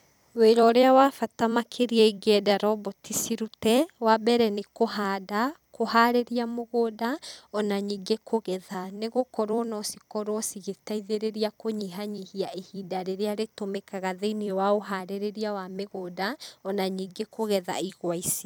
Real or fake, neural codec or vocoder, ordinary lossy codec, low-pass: fake; vocoder, 44.1 kHz, 128 mel bands every 256 samples, BigVGAN v2; none; none